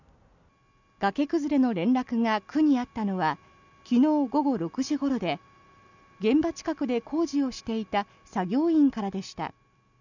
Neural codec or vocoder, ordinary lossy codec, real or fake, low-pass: none; none; real; 7.2 kHz